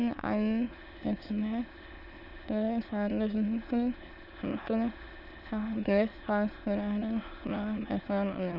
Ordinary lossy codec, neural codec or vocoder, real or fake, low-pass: none; autoencoder, 22.05 kHz, a latent of 192 numbers a frame, VITS, trained on many speakers; fake; 5.4 kHz